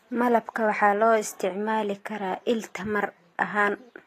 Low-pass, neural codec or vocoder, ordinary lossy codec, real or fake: 14.4 kHz; none; AAC, 48 kbps; real